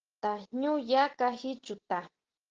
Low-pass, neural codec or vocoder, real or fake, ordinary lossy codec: 7.2 kHz; none; real; Opus, 16 kbps